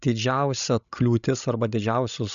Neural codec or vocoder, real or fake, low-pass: codec, 16 kHz, 16 kbps, FreqCodec, larger model; fake; 7.2 kHz